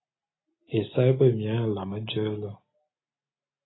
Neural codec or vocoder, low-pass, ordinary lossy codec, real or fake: none; 7.2 kHz; AAC, 16 kbps; real